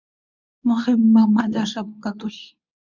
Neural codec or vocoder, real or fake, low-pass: codec, 24 kHz, 0.9 kbps, WavTokenizer, medium speech release version 2; fake; 7.2 kHz